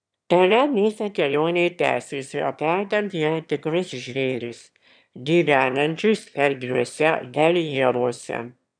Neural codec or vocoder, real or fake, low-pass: autoencoder, 22.05 kHz, a latent of 192 numbers a frame, VITS, trained on one speaker; fake; 9.9 kHz